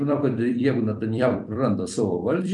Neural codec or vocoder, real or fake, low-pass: vocoder, 44.1 kHz, 128 mel bands every 256 samples, BigVGAN v2; fake; 10.8 kHz